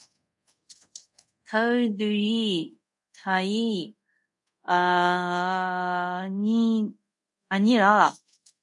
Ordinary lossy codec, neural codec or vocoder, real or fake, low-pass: MP3, 96 kbps; codec, 24 kHz, 0.5 kbps, DualCodec; fake; 10.8 kHz